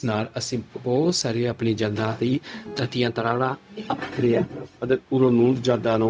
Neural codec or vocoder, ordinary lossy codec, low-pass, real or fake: codec, 16 kHz, 0.4 kbps, LongCat-Audio-Codec; none; none; fake